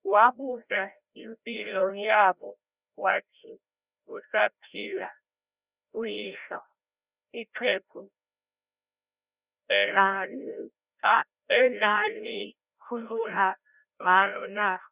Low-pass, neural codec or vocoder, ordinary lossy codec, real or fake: 3.6 kHz; codec, 16 kHz, 0.5 kbps, FreqCodec, larger model; Opus, 64 kbps; fake